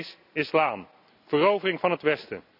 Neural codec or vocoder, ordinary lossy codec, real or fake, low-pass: none; none; real; 5.4 kHz